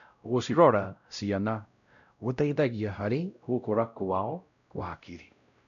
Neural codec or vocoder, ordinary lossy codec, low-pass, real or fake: codec, 16 kHz, 0.5 kbps, X-Codec, WavLM features, trained on Multilingual LibriSpeech; none; 7.2 kHz; fake